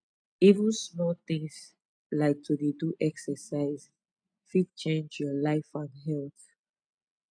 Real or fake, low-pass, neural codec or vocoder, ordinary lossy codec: real; 9.9 kHz; none; none